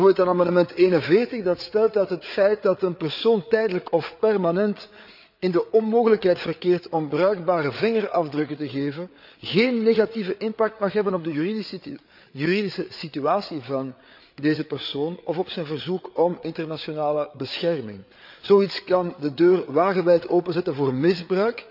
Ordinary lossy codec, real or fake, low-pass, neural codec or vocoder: none; fake; 5.4 kHz; codec, 16 kHz, 8 kbps, FreqCodec, larger model